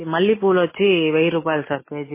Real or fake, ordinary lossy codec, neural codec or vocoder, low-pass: real; MP3, 16 kbps; none; 3.6 kHz